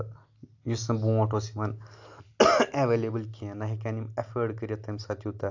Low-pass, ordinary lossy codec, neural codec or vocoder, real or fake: 7.2 kHz; AAC, 48 kbps; none; real